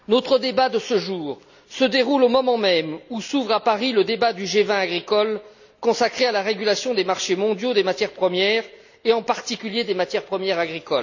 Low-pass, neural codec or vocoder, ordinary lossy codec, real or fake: 7.2 kHz; none; none; real